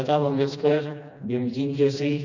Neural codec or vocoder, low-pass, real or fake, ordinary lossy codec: codec, 16 kHz, 1 kbps, FreqCodec, smaller model; 7.2 kHz; fake; none